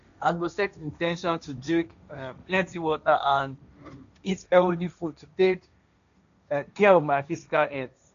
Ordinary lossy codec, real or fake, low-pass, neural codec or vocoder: none; fake; 7.2 kHz; codec, 16 kHz, 1.1 kbps, Voila-Tokenizer